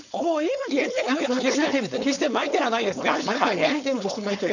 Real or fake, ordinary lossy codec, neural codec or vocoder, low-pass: fake; none; codec, 16 kHz, 4.8 kbps, FACodec; 7.2 kHz